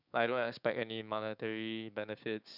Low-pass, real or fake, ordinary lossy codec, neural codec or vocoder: 5.4 kHz; fake; none; codec, 16 kHz, 6 kbps, DAC